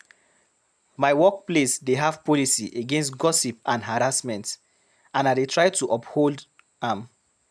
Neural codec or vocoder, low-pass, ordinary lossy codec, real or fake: none; none; none; real